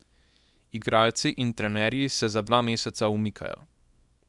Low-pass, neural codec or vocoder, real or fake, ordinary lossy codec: 10.8 kHz; codec, 24 kHz, 0.9 kbps, WavTokenizer, small release; fake; none